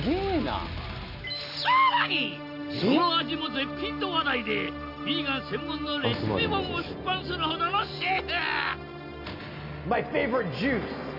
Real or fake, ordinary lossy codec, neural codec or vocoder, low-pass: real; none; none; 5.4 kHz